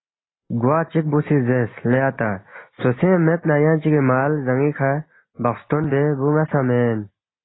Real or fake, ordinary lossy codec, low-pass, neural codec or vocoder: real; AAC, 16 kbps; 7.2 kHz; none